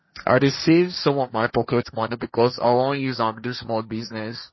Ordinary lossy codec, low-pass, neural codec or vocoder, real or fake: MP3, 24 kbps; 7.2 kHz; codec, 16 kHz, 1.1 kbps, Voila-Tokenizer; fake